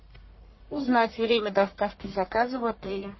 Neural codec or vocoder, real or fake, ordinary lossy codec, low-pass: codec, 44.1 kHz, 1.7 kbps, Pupu-Codec; fake; MP3, 24 kbps; 7.2 kHz